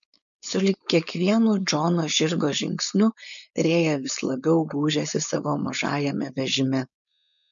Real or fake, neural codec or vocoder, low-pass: fake; codec, 16 kHz, 4.8 kbps, FACodec; 7.2 kHz